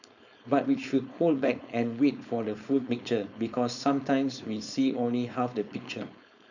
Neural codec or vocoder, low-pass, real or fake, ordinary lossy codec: codec, 16 kHz, 4.8 kbps, FACodec; 7.2 kHz; fake; none